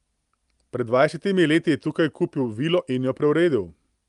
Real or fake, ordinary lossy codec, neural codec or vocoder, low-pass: real; Opus, 32 kbps; none; 10.8 kHz